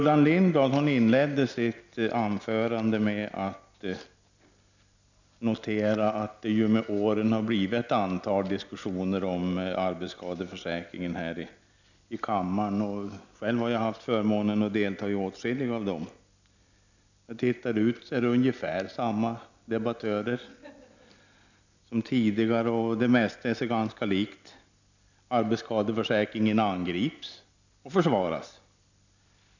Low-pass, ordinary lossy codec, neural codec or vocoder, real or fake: 7.2 kHz; none; none; real